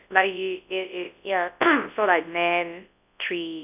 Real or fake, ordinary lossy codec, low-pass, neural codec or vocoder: fake; none; 3.6 kHz; codec, 24 kHz, 0.9 kbps, WavTokenizer, large speech release